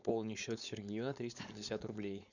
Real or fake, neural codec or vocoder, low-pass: fake; codec, 16 kHz, 4.8 kbps, FACodec; 7.2 kHz